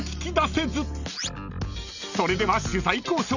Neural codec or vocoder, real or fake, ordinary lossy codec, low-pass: none; real; none; 7.2 kHz